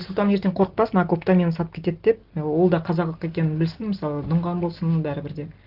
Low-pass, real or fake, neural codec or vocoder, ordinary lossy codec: 5.4 kHz; real; none; Opus, 16 kbps